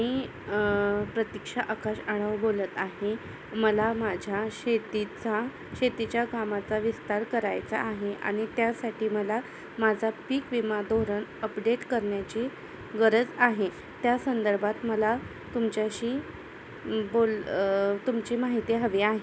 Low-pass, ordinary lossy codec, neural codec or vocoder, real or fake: none; none; none; real